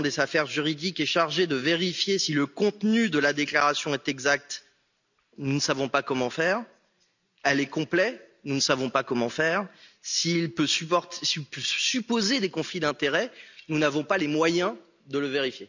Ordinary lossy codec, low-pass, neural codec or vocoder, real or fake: none; 7.2 kHz; none; real